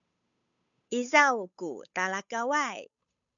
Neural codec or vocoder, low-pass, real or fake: codec, 16 kHz, 8 kbps, FunCodec, trained on Chinese and English, 25 frames a second; 7.2 kHz; fake